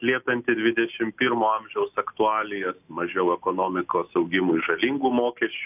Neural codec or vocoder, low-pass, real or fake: none; 3.6 kHz; real